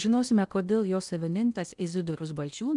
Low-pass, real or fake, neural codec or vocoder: 10.8 kHz; fake; codec, 16 kHz in and 24 kHz out, 0.8 kbps, FocalCodec, streaming, 65536 codes